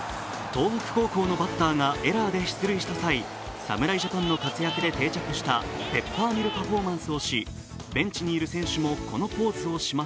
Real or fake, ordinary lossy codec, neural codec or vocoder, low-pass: real; none; none; none